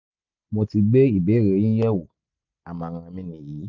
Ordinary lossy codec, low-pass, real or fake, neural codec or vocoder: none; 7.2 kHz; real; none